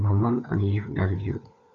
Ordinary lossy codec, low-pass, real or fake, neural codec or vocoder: Opus, 64 kbps; 7.2 kHz; fake; codec, 16 kHz, 4 kbps, FunCodec, trained on LibriTTS, 50 frames a second